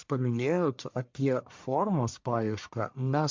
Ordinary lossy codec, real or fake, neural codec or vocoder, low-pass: MP3, 64 kbps; fake; codec, 44.1 kHz, 1.7 kbps, Pupu-Codec; 7.2 kHz